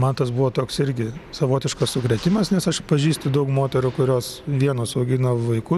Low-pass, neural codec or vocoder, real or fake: 14.4 kHz; none; real